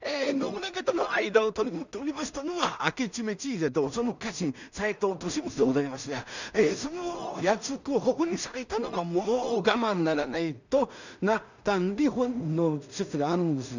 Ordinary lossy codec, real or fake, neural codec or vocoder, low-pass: none; fake; codec, 16 kHz in and 24 kHz out, 0.4 kbps, LongCat-Audio-Codec, two codebook decoder; 7.2 kHz